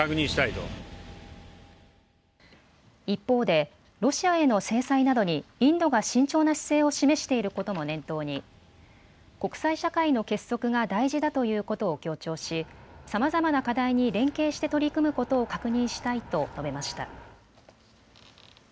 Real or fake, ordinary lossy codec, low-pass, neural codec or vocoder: real; none; none; none